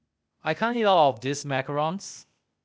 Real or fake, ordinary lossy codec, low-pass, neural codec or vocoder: fake; none; none; codec, 16 kHz, 0.8 kbps, ZipCodec